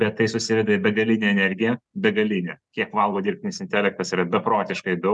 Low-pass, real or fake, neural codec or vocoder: 10.8 kHz; real; none